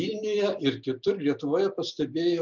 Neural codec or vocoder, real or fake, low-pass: none; real; 7.2 kHz